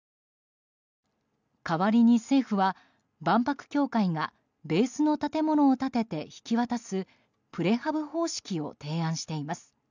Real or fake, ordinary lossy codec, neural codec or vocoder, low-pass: real; none; none; 7.2 kHz